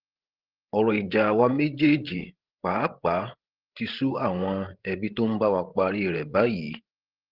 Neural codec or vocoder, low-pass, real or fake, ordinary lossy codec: codec, 16 kHz, 16 kbps, FreqCodec, larger model; 5.4 kHz; fake; Opus, 16 kbps